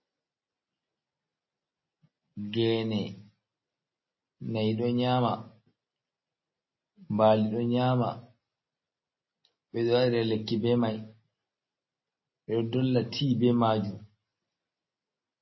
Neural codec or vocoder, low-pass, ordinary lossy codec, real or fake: none; 7.2 kHz; MP3, 24 kbps; real